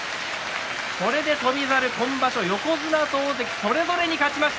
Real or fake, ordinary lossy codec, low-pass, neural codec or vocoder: real; none; none; none